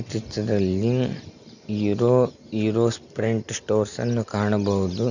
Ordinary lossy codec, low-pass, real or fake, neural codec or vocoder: none; 7.2 kHz; real; none